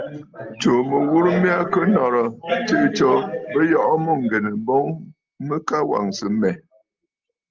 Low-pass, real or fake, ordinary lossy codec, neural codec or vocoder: 7.2 kHz; real; Opus, 24 kbps; none